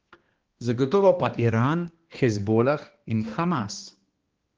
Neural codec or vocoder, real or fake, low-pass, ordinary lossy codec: codec, 16 kHz, 1 kbps, X-Codec, HuBERT features, trained on balanced general audio; fake; 7.2 kHz; Opus, 16 kbps